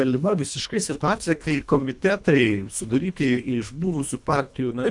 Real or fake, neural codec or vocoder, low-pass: fake; codec, 24 kHz, 1.5 kbps, HILCodec; 10.8 kHz